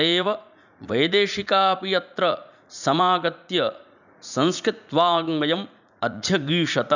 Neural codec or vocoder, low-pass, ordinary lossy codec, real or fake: vocoder, 44.1 kHz, 80 mel bands, Vocos; 7.2 kHz; none; fake